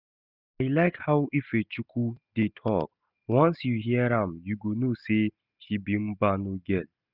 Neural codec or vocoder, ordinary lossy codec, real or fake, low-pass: none; none; real; 5.4 kHz